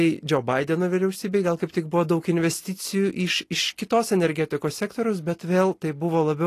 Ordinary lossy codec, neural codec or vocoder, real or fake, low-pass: AAC, 48 kbps; none; real; 14.4 kHz